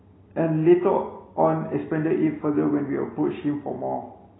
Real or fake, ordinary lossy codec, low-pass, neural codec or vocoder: real; AAC, 16 kbps; 7.2 kHz; none